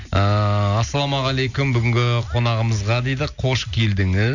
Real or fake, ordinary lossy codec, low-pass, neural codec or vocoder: real; none; 7.2 kHz; none